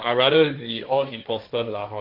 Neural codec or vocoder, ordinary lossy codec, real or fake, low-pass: codec, 16 kHz, 1.1 kbps, Voila-Tokenizer; none; fake; 5.4 kHz